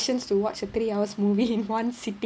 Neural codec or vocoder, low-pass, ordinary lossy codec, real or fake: none; none; none; real